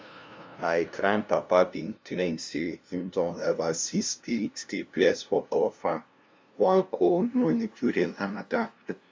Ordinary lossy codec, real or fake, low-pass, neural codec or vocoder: none; fake; none; codec, 16 kHz, 0.5 kbps, FunCodec, trained on LibriTTS, 25 frames a second